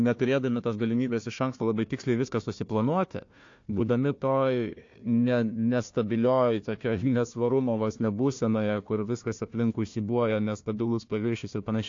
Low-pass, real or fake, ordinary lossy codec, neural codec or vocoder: 7.2 kHz; fake; AAC, 48 kbps; codec, 16 kHz, 1 kbps, FunCodec, trained on Chinese and English, 50 frames a second